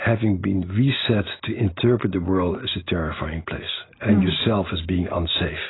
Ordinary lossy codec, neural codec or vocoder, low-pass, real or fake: AAC, 16 kbps; none; 7.2 kHz; real